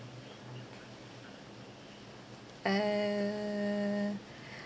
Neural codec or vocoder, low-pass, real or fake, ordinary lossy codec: none; none; real; none